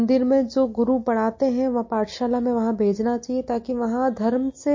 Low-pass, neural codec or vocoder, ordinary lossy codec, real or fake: 7.2 kHz; none; MP3, 32 kbps; real